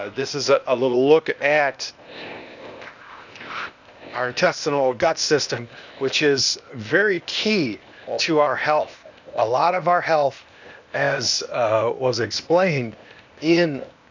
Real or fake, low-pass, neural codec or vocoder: fake; 7.2 kHz; codec, 16 kHz, 0.8 kbps, ZipCodec